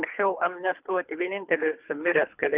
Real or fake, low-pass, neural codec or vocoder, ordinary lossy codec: fake; 3.6 kHz; codec, 24 kHz, 3 kbps, HILCodec; Opus, 64 kbps